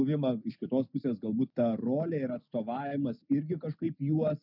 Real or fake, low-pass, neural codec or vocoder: real; 5.4 kHz; none